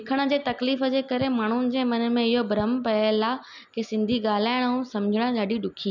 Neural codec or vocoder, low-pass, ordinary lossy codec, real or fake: none; 7.2 kHz; none; real